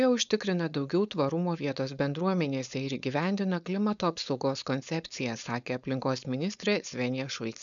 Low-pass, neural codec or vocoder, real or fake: 7.2 kHz; codec, 16 kHz, 4.8 kbps, FACodec; fake